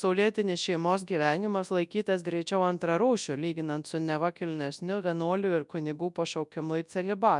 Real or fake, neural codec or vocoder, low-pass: fake; codec, 24 kHz, 0.9 kbps, WavTokenizer, large speech release; 10.8 kHz